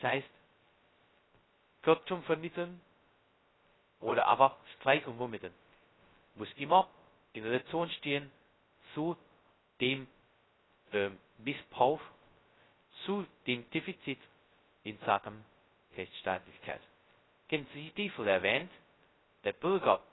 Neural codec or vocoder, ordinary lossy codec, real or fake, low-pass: codec, 16 kHz, 0.2 kbps, FocalCodec; AAC, 16 kbps; fake; 7.2 kHz